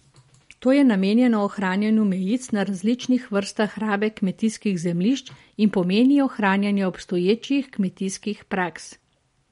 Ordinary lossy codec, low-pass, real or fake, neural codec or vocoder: MP3, 48 kbps; 10.8 kHz; real; none